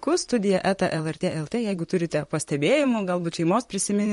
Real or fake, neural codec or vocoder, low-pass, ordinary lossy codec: fake; vocoder, 44.1 kHz, 128 mel bands, Pupu-Vocoder; 19.8 kHz; MP3, 48 kbps